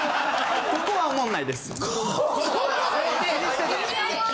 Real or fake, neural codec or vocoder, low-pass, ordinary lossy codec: real; none; none; none